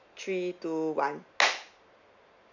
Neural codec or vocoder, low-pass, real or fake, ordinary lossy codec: none; 7.2 kHz; real; none